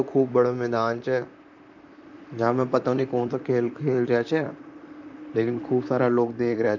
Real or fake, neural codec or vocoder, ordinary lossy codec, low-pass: fake; vocoder, 44.1 kHz, 128 mel bands, Pupu-Vocoder; none; 7.2 kHz